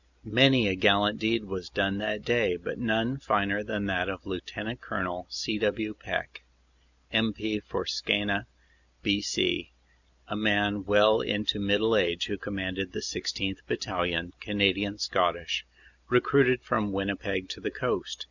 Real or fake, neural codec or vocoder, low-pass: real; none; 7.2 kHz